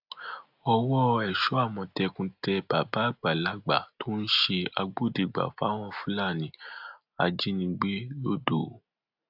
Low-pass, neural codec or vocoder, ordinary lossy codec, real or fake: 5.4 kHz; none; AAC, 48 kbps; real